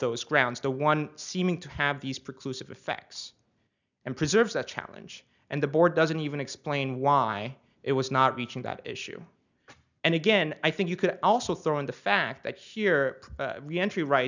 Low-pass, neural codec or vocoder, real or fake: 7.2 kHz; none; real